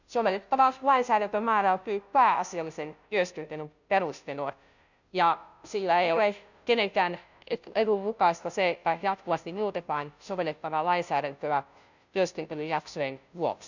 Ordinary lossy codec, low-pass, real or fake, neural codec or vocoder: none; 7.2 kHz; fake; codec, 16 kHz, 0.5 kbps, FunCodec, trained on Chinese and English, 25 frames a second